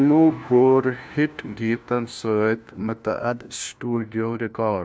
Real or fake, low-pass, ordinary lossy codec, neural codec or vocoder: fake; none; none; codec, 16 kHz, 1 kbps, FunCodec, trained on LibriTTS, 50 frames a second